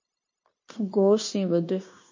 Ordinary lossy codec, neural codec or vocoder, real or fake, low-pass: MP3, 32 kbps; codec, 16 kHz, 0.9 kbps, LongCat-Audio-Codec; fake; 7.2 kHz